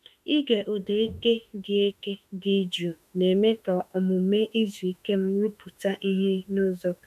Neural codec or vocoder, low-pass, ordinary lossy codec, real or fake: autoencoder, 48 kHz, 32 numbers a frame, DAC-VAE, trained on Japanese speech; 14.4 kHz; none; fake